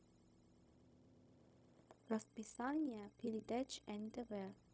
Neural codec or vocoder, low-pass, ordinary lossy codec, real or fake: codec, 16 kHz, 0.4 kbps, LongCat-Audio-Codec; none; none; fake